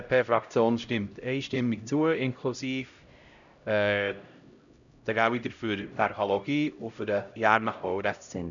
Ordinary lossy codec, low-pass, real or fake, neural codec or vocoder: none; 7.2 kHz; fake; codec, 16 kHz, 0.5 kbps, X-Codec, HuBERT features, trained on LibriSpeech